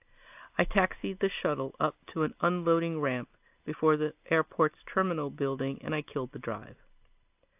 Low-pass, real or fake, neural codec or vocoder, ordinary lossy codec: 3.6 kHz; real; none; AAC, 32 kbps